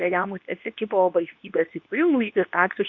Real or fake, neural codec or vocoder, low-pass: fake; codec, 24 kHz, 0.9 kbps, WavTokenizer, medium speech release version 2; 7.2 kHz